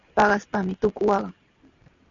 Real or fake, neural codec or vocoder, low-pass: real; none; 7.2 kHz